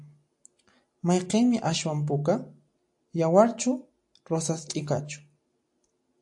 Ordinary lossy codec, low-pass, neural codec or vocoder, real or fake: AAC, 64 kbps; 10.8 kHz; none; real